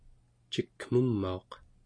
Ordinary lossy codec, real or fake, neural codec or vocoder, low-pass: MP3, 48 kbps; real; none; 9.9 kHz